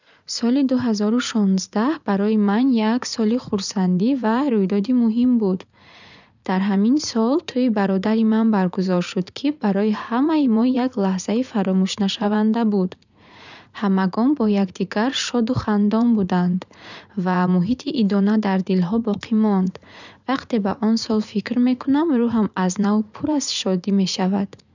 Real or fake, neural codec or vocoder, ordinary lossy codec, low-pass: fake; vocoder, 44.1 kHz, 80 mel bands, Vocos; none; 7.2 kHz